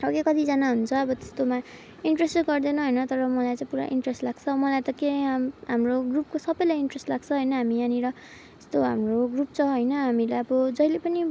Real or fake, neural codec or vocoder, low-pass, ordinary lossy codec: real; none; none; none